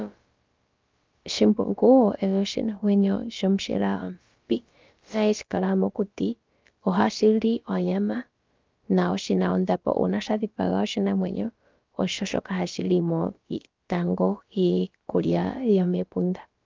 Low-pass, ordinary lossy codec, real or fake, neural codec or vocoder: 7.2 kHz; Opus, 24 kbps; fake; codec, 16 kHz, about 1 kbps, DyCAST, with the encoder's durations